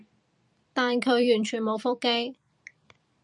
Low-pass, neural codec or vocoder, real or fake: 10.8 kHz; vocoder, 44.1 kHz, 128 mel bands every 256 samples, BigVGAN v2; fake